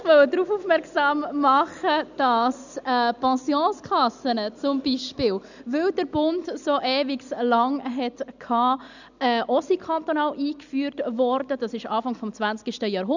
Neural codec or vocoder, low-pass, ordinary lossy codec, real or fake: none; 7.2 kHz; none; real